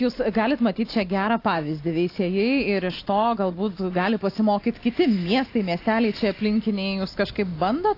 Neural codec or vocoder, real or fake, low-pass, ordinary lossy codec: none; real; 5.4 kHz; AAC, 32 kbps